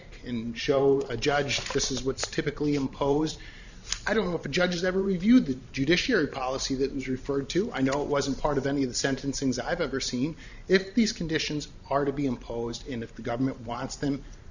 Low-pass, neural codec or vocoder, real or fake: 7.2 kHz; vocoder, 44.1 kHz, 128 mel bands every 512 samples, BigVGAN v2; fake